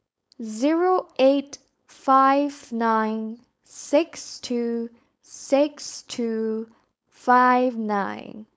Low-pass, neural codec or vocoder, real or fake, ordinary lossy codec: none; codec, 16 kHz, 4.8 kbps, FACodec; fake; none